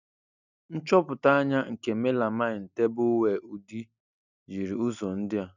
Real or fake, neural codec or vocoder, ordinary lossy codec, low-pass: real; none; none; 7.2 kHz